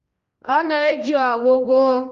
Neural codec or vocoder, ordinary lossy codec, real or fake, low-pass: codec, 16 kHz, 1 kbps, X-Codec, HuBERT features, trained on general audio; Opus, 32 kbps; fake; 7.2 kHz